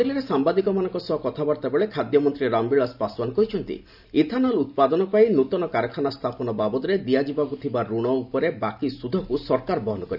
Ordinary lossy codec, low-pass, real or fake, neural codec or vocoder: none; 5.4 kHz; real; none